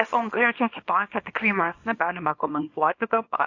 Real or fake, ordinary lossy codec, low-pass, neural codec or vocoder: fake; AAC, 48 kbps; 7.2 kHz; codec, 24 kHz, 0.9 kbps, WavTokenizer, small release